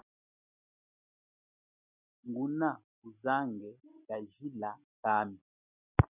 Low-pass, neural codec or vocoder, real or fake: 3.6 kHz; none; real